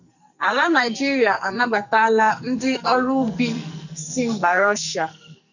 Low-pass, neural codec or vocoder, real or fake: 7.2 kHz; codec, 44.1 kHz, 2.6 kbps, SNAC; fake